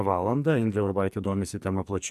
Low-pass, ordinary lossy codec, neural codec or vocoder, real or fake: 14.4 kHz; AAC, 96 kbps; codec, 44.1 kHz, 2.6 kbps, SNAC; fake